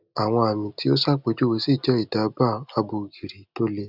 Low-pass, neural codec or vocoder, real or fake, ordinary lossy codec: 5.4 kHz; none; real; none